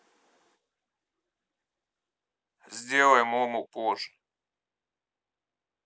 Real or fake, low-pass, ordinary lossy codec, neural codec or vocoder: real; none; none; none